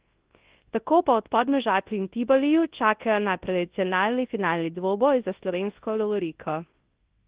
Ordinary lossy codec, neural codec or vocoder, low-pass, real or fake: Opus, 16 kbps; codec, 24 kHz, 0.9 kbps, WavTokenizer, large speech release; 3.6 kHz; fake